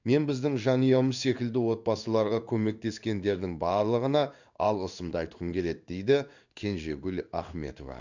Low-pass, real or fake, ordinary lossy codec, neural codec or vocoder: 7.2 kHz; fake; none; codec, 16 kHz in and 24 kHz out, 1 kbps, XY-Tokenizer